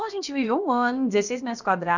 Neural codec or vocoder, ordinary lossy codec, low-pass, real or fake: codec, 16 kHz, about 1 kbps, DyCAST, with the encoder's durations; none; 7.2 kHz; fake